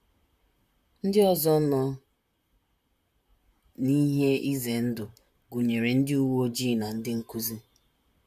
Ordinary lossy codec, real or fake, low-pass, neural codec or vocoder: MP3, 96 kbps; fake; 14.4 kHz; vocoder, 44.1 kHz, 128 mel bands, Pupu-Vocoder